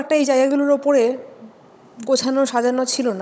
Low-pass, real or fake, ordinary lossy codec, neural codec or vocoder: none; fake; none; codec, 16 kHz, 16 kbps, FunCodec, trained on Chinese and English, 50 frames a second